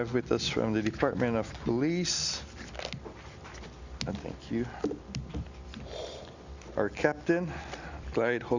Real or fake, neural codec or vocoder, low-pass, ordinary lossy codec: real; none; 7.2 kHz; Opus, 64 kbps